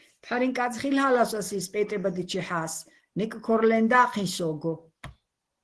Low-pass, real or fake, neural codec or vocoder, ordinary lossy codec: 10.8 kHz; real; none; Opus, 16 kbps